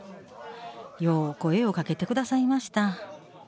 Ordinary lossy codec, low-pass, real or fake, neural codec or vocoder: none; none; real; none